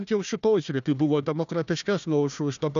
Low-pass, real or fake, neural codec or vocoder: 7.2 kHz; fake; codec, 16 kHz, 1 kbps, FunCodec, trained on Chinese and English, 50 frames a second